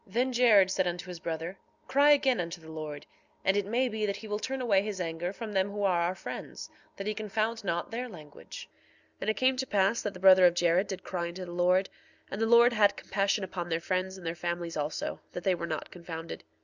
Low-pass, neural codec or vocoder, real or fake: 7.2 kHz; none; real